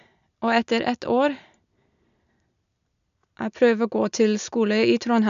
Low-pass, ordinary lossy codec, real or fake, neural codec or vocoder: 7.2 kHz; MP3, 96 kbps; real; none